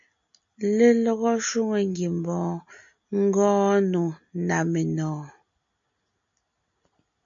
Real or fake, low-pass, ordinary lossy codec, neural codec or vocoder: real; 7.2 kHz; MP3, 64 kbps; none